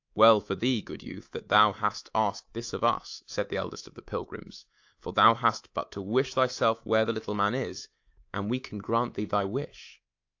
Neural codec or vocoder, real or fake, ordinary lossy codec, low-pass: codec, 24 kHz, 3.1 kbps, DualCodec; fake; AAC, 48 kbps; 7.2 kHz